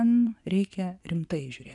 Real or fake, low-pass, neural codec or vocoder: fake; 10.8 kHz; vocoder, 24 kHz, 100 mel bands, Vocos